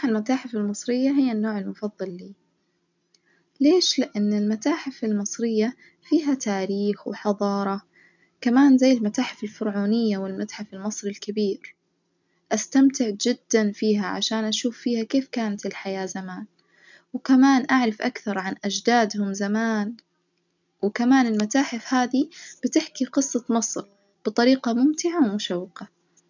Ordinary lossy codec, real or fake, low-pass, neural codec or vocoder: none; real; 7.2 kHz; none